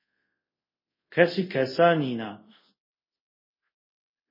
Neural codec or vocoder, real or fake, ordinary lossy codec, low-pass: codec, 24 kHz, 0.5 kbps, DualCodec; fake; MP3, 24 kbps; 5.4 kHz